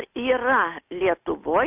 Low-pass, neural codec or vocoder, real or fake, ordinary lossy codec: 3.6 kHz; none; real; AAC, 32 kbps